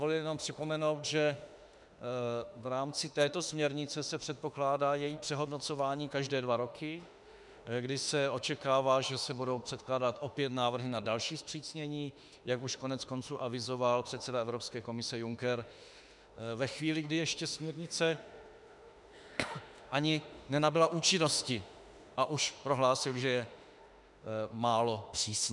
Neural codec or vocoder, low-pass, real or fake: autoencoder, 48 kHz, 32 numbers a frame, DAC-VAE, trained on Japanese speech; 10.8 kHz; fake